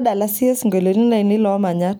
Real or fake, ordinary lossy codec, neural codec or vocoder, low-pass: real; none; none; none